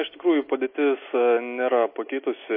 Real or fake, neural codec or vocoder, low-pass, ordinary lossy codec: real; none; 5.4 kHz; MP3, 24 kbps